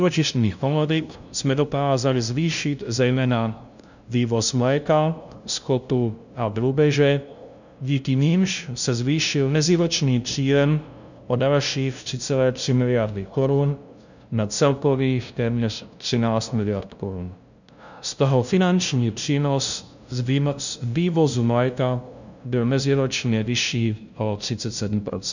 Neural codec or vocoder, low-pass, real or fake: codec, 16 kHz, 0.5 kbps, FunCodec, trained on LibriTTS, 25 frames a second; 7.2 kHz; fake